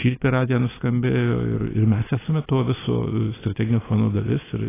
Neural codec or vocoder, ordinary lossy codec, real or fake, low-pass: none; AAC, 16 kbps; real; 3.6 kHz